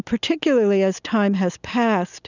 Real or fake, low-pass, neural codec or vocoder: real; 7.2 kHz; none